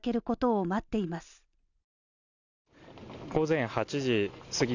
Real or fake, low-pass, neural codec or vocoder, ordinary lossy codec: real; 7.2 kHz; none; none